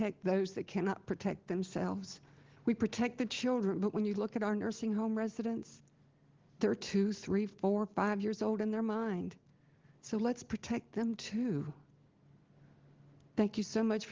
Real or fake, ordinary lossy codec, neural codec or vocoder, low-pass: real; Opus, 16 kbps; none; 7.2 kHz